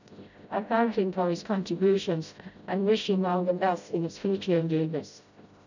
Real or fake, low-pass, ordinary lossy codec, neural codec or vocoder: fake; 7.2 kHz; none; codec, 16 kHz, 0.5 kbps, FreqCodec, smaller model